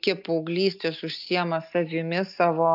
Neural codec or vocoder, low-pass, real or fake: none; 5.4 kHz; real